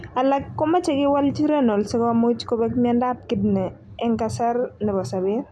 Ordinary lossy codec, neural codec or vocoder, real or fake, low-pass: none; none; real; none